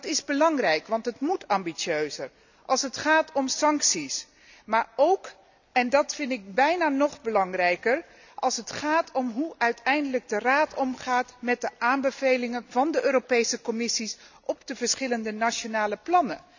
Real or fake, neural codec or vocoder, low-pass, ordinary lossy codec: real; none; 7.2 kHz; none